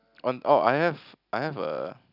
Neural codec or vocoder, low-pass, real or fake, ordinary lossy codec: none; 5.4 kHz; real; none